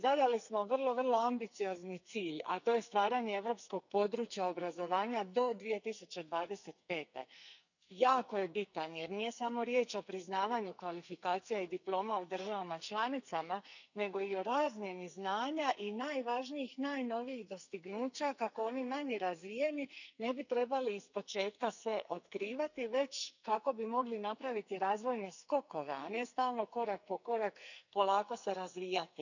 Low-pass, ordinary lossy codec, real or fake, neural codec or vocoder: 7.2 kHz; none; fake; codec, 44.1 kHz, 2.6 kbps, SNAC